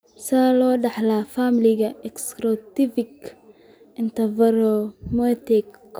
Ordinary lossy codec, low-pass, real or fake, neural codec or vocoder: none; none; real; none